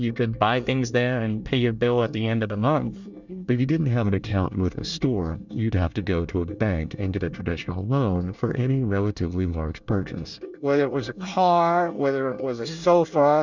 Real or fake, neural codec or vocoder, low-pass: fake; codec, 24 kHz, 1 kbps, SNAC; 7.2 kHz